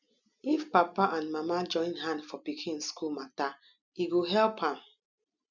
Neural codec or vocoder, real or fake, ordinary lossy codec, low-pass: none; real; none; 7.2 kHz